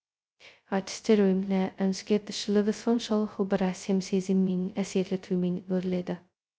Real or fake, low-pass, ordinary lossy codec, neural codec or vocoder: fake; none; none; codec, 16 kHz, 0.2 kbps, FocalCodec